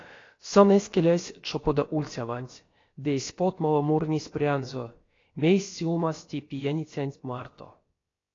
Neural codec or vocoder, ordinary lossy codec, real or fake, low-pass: codec, 16 kHz, about 1 kbps, DyCAST, with the encoder's durations; AAC, 32 kbps; fake; 7.2 kHz